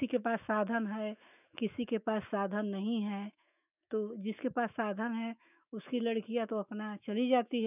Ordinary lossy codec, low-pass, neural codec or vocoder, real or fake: none; 3.6 kHz; autoencoder, 48 kHz, 128 numbers a frame, DAC-VAE, trained on Japanese speech; fake